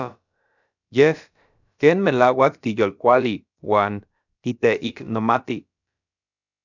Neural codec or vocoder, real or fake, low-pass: codec, 16 kHz, about 1 kbps, DyCAST, with the encoder's durations; fake; 7.2 kHz